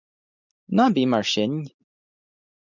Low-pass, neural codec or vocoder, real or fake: 7.2 kHz; none; real